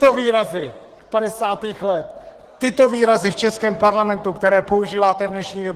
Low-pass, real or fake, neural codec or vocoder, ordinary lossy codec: 14.4 kHz; fake; codec, 32 kHz, 1.9 kbps, SNAC; Opus, 16 kbps